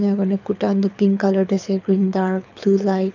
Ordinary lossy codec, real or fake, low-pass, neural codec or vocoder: none; fake; 7.2 kHz; codec, 24 kHz, 6 kbps, HILCodec